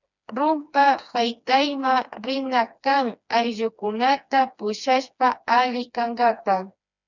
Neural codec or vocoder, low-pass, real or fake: codec, 16 kHz, 2 kbps, FreqCodec, smaller model; 7.2 kHz; fake